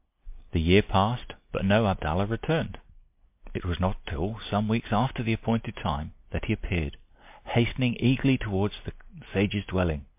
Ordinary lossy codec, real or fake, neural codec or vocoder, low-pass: MP3, 32 kbps; real; none; 3.6 kHz